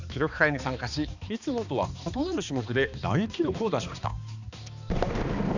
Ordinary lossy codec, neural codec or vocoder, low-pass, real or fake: none; codec, 16 kHz, 2 kbps, X-Codec, HuBERT features, trained on balanced general audio; 7.2 kHz; fake